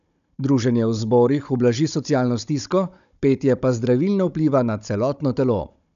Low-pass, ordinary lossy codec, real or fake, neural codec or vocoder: 7.2 kHz; none; fake; codec, 16 kHz, 16 kbps, FunCodec, trained on Chinese and English, 50 frames a second